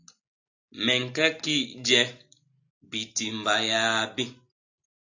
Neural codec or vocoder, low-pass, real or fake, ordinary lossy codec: none; 7.2 kHz; real; AAC, 48 kbps